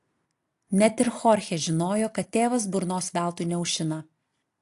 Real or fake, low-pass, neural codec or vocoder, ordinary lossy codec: real; 14.4 kHz; none; AAC, 64 kbps